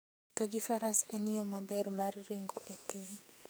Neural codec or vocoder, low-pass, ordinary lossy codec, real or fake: codec, 44.1 kHz, 2.6 kbps, SNAC; none; none; fake